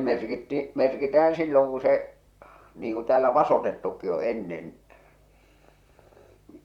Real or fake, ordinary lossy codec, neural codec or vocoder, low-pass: fake; Opus, 64 kbps; vocoder, 44.1 kHz, 128 mel bands, Pupu-Vocoder; 19.8 kHz